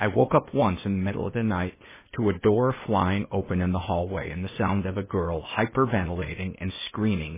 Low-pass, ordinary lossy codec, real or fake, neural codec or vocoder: 3.6 kHz; MP3, 16 kbps; fake; codec, 16 kHz, 0.8 kbps, ZipCodec